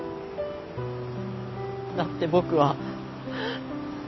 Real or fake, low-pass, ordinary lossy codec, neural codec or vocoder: real; 7.2 kHz; MP3, 24 kbps; none